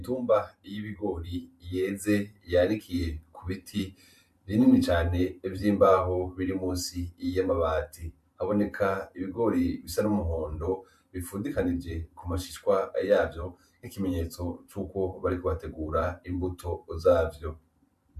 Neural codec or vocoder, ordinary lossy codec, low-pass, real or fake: vocoder, 44.1 kHz, 128 mel bands every 512 samples, BigVGAN v2; MP3, 96 kbps; 14.4 kHz; fake